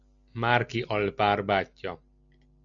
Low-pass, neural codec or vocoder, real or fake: 7.2 kHz; none; real